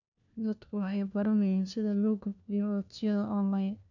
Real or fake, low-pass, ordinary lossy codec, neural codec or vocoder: fake; 7.2 kHz; none; codec, 16 kHz, 1 kbps, FunCodec, trained on LibriTTS, 50 frames a second